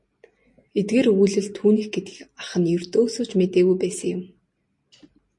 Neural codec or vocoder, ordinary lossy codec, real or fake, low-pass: none; MP3, 48 kbps; real; 10.8 kHz